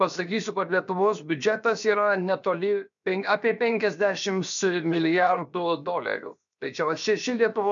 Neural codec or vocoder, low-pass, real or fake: codec, 16 kHz, 0.7 kbps, FocalCodec; 7.2 kHz; fake